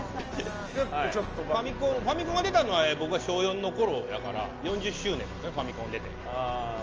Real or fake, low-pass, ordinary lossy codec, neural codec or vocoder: real; 7.2 kHz; Opus, 24 kbps; none